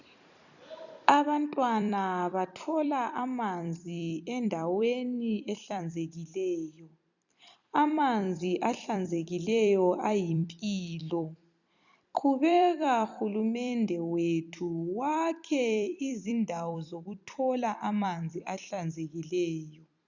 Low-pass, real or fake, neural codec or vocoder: 7.2 kHz; fake; vocoder, 44.1 kHz, 128 mel bands every 256 samples, BigVGAN v2